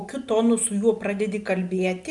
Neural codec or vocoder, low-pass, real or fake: none; 10.8 kHz; real